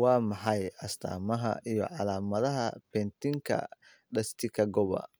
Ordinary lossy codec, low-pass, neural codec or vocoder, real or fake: none; none; none; real